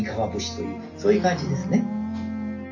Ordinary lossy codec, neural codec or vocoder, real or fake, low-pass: none; none; real; 7.2 kHz